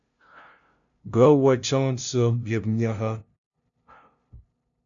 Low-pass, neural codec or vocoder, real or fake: 7.2 kHz; codec, 16 kHz, 0.5 kbps, FunCodec, trained on LibriTTS, 25 frames a second; fake